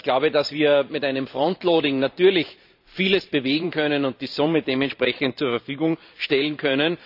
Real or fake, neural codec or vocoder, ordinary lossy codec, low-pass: fake; vocoder, 44.1 kHz, 128 mel bands every 512 samples, BigVGAN v2; none; 5.4 kHz